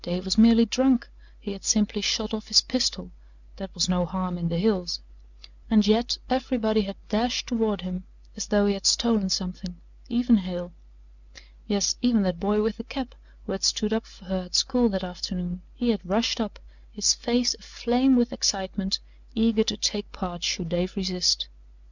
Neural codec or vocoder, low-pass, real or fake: none; 7.2 kHz; real